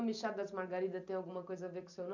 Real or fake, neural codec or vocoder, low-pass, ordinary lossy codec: real; none; 7.2 kHz; none